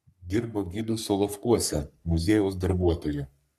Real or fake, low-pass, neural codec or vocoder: fake; 14.4 kHz; codec, 44.1 kHz, 3.4 kbps, Pupu-Codec